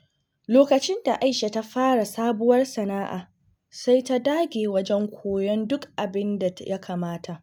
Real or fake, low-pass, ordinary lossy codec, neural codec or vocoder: real; none; none; none